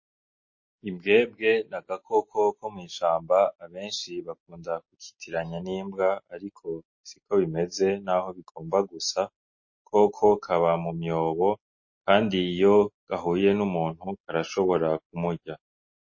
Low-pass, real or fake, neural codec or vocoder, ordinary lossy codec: 7.2 kHz; real; none; MP3, 32 kbps